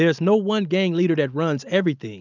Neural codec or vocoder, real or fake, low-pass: none; real; 7.2 kHz